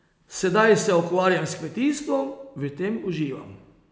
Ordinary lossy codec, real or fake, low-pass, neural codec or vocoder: none; real; none; none